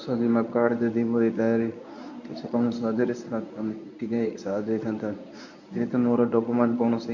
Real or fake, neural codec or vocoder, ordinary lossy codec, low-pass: fake; codec, 24 kHz, 0.9 kbps, WavTokenizer, medium speech release version 1; none; 7.2 kHz